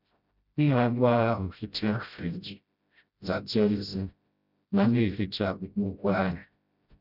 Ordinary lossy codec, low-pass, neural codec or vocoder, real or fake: none; 5.4 kHz; codec, 16 kHz, 0.5 kbps, FreqCodec, smaller model; fake